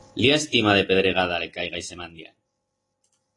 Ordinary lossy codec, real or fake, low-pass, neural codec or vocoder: AAC, 32 kbps; real; 10.8 kHz; none